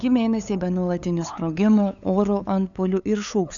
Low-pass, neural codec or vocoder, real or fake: 7.2 kHz; codec, 16 kHz, 8 kbps, FunCodec, trained on LibriTTS, 25 frames a second; fake